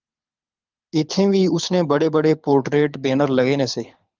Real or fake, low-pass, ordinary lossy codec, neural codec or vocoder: fake; 7.2 kHz; Opus, 24 kbps; codec, 24 kHz, 6 kbps, HILCodec